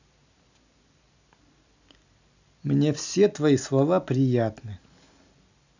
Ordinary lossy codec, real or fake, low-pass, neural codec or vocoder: none; real; 7.2 kHz; none